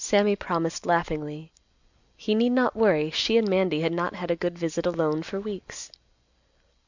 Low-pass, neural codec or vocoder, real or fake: 7.2 kHz; none; real